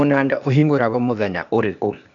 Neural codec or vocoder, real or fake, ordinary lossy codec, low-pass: codec, 16 kHz, 0.8 kbps, ZipCodec; fake; none; 7.2 kHz